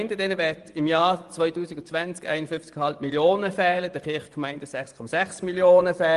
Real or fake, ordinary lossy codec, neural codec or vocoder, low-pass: fake; Opus, 24 kbps; vocoder, 24 kHz, 100 mel bands, Vocos; 10.8 kHz